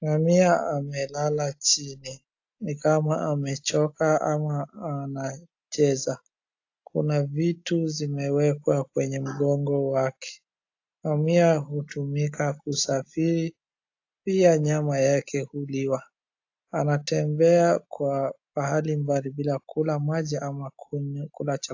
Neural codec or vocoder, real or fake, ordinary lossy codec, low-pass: none; real; AAC, 48 kbps; 7.2 kHz